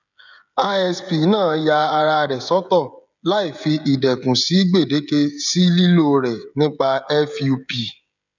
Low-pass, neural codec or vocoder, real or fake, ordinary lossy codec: 7.2 kHz; codec, 16 kHz, 16 kbps, FreqCodec, smaller model; fake; none